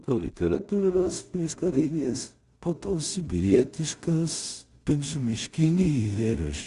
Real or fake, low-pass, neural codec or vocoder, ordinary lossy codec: fake; 10.8 kHz; codec, 16 kHz in and 24 kHz out, 0.4 kbps, LongCat-Audio-Codec, two codebook decoder; Opus, 64 kbps